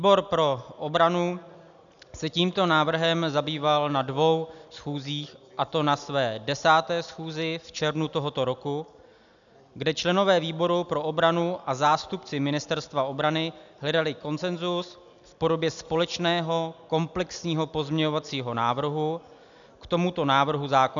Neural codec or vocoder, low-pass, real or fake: none; 7.2 kHz; real